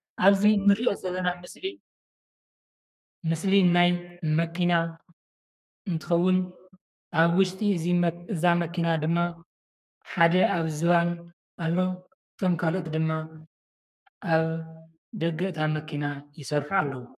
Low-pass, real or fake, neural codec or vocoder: 14.4 kHz; fake; codec, 32 kHz, 1.9 kbps, SNAC